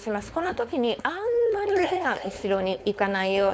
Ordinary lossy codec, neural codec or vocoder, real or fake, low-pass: none; codec, 16 kHz, 4.8 kbps, FACodec; fake; none